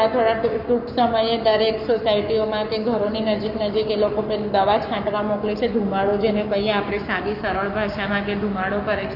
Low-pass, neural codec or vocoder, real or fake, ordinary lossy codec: 5.4 kHz; codec, 44.1 kHz, 7.8 kbps, Pupu-Codec; fake; none